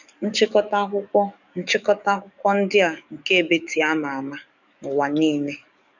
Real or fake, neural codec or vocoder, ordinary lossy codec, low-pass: fake; codec, 44.1 kHz, 7.8 kbps, DAC; none; 7.2 kHz